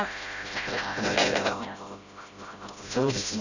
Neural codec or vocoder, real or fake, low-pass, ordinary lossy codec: codec, 16 kHz, 0.5 kbps, FreqCodec, smaller model; fake; 7.2 kHz; none